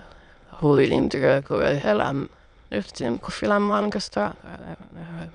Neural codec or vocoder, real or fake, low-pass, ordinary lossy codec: autoencoder, 22.05 kHz, a latent of 192 numbers a frame, VITS, trained on many speakers; fake; 9.9 kHz; none